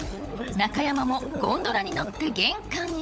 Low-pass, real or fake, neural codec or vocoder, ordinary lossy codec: none; fake; codec, 16 kHz, 16 kbps, FunCodec, trained on LibriTTS, 50 frames a second; none